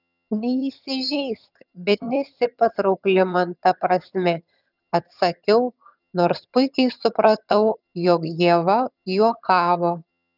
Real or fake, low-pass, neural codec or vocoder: fake; 5.4 kHz; vocoder, 22.05 kHz, 80 mel bands, HiFi-GAN